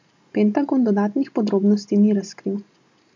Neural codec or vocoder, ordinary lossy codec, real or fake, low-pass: none; MP3, 48 kbps; real; 7.2 kHz